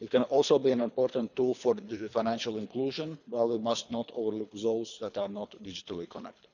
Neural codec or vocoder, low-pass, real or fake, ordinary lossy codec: codec, 24 kHz, 3 kbps, HILCodec; 7.2 kHz; fake; none